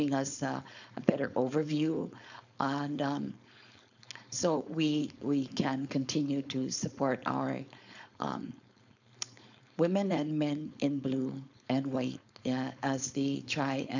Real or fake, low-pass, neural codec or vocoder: fake; 7.2 kHz; codec, 16 kHz, 4.8 kbps, FACodec